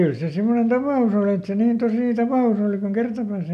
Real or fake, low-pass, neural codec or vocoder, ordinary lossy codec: real; 14.4 kHz; none; none